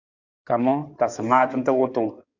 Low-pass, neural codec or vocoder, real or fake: 7.2 kHz; codec, 16 kHz in and 24 kHz out, 2.2 kbps, FireRedTTS-2 codec; fake